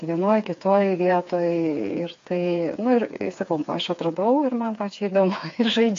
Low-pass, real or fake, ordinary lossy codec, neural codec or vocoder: 7.2 kHz; fake; AAC, 48 kbps; codec, 16 kHz, 4 kbps, FreqCodec, smaller model